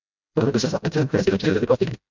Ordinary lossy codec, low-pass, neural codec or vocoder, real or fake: MP3, 64 kbps; 7.2 kHz; codec, 16 kHz, 0.5 kbps, FreqCodec, smaller model; fake